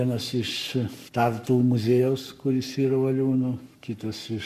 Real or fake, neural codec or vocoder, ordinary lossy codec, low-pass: fake; codec, 44.1 kHz, 7.8 kbps, Pupu-Codec; MP3, 96 kbps; 14.4 kHz